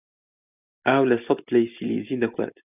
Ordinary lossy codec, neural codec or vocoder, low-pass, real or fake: AAC, 32 kbps; none; 3.6 kHz; real